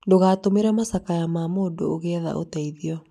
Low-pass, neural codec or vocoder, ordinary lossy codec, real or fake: 14.4 kHz; none; none; real